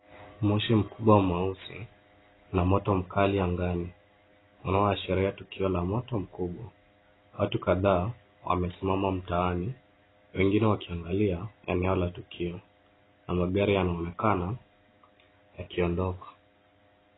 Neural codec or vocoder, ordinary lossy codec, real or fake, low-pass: none; AAC, 16 kbps; real; 7.2 kHz